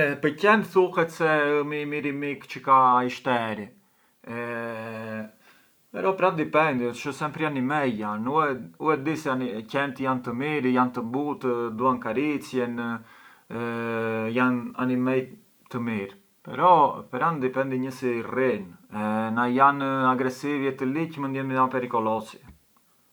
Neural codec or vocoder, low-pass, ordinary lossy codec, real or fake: none; none; none; real